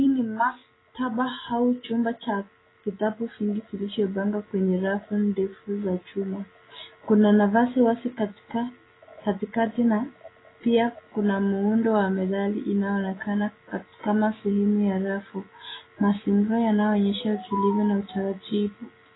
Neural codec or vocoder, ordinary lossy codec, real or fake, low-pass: none; AAC, 16 kbps; real; 7.2 kHz